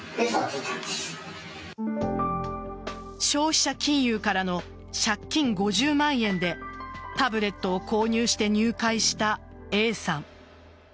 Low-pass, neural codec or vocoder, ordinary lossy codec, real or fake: none; none; none; real